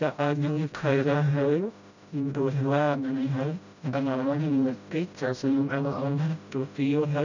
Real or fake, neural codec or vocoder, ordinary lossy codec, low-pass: fake; codec, 16 kHz, 0.5 kbps, FreqCodec, smaller model; none; 7.2 kHz